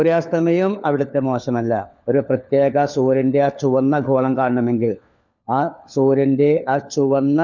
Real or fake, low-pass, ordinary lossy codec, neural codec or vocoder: fake; 7.2 kHz; none; codec, 16 kHz, 4 kbps, FunCodec, trained on LibriTTS, 50 frames a second